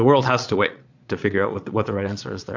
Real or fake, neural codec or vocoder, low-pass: fake; vocoder, 22.05 kHz, 80 mel bands, Vocos; 7.2 kHz